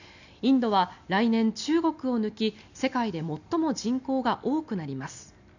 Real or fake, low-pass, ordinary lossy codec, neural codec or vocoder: real; 7.2 kHz; none; none